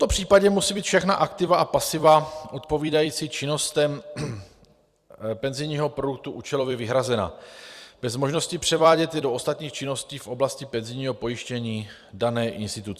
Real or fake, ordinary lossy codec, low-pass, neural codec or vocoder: fake; Opus, 64 kbps; 14.4 kHz; vocoder, 44.1 kHz, 128 mel bands every 256 samples, BigVGAN v2